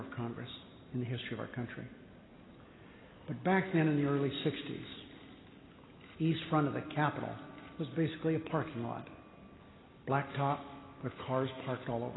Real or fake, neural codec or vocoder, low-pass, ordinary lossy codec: real; none; 7.2 kHz; AAC, 16 kbps